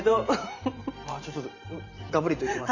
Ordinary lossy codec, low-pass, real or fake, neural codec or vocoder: none; 7.2 kHz; fake; vocoder, 44.1 kHz, 128 mel bands every 512 samples, BigVGAN v2